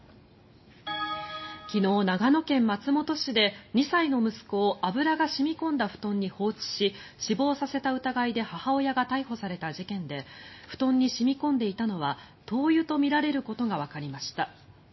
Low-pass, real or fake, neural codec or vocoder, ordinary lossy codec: 7.2 kHz; real; none; MP3, 24 kbps